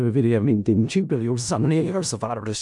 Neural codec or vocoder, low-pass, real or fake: codec, 16 kHz in and 24 kHz out, 0.4 kbps, LongCat-Audio-Codec, four codebook decoder; 10.8 kHz; fake